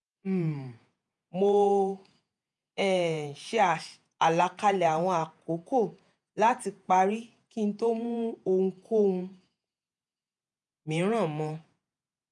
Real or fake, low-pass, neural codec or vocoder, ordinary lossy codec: fake; 10.8 kHz; vocoder, 48 kHz, 128 mel bands, Vocos; none